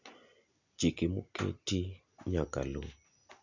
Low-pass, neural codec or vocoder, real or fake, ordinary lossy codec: 7.2 kHz; none; real; none